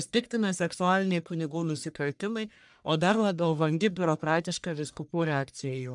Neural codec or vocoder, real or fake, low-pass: codec, 44.1 kHz, 1.7 kbps, Pupu-Codec; fake; 10.8 kHz